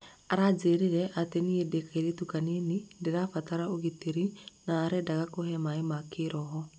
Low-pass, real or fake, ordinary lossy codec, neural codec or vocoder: none; real; none; none